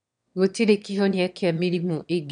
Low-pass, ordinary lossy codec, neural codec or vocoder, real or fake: 9.9 kHz; none; autoencoder, 22.05 kHz, a latent of 192 numbers a frame, VITS, trained on one speaker; fake